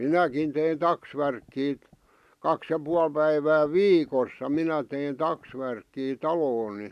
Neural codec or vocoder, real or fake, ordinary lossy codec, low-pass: none; real; none; 14.4 kHz